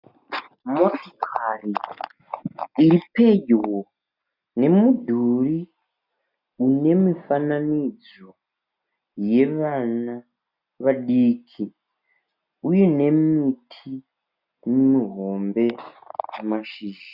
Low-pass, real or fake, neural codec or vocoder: 5.4 kHz; real; none